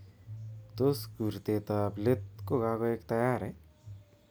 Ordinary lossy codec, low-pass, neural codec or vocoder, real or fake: none; none; none; real